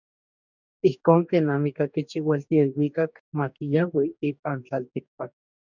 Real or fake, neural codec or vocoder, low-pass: fake; codec, 44.1 kHz, 2.6 kbps, DAC; 7.2 kHz